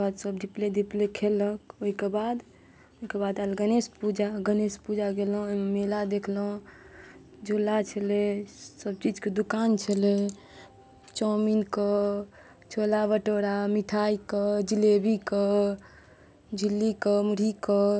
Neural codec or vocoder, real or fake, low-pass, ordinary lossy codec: none; real; none; none